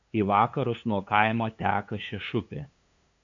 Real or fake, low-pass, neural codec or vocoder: fake; 7.2 kHz; codec, 16 kHz, 2 kbps, FunCodec, trained on LibriTTS, 25 frames a second